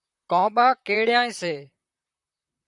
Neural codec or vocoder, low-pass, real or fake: vocoder, 44.1 kHz, 128 mel bands, Pupu-Vocoder; 10.8 kHz; fake